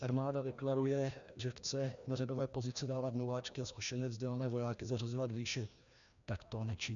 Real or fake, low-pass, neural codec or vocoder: fake; 7.2 kHz; codec, 16 kHz, 1 kbps, FreqCodec, larger model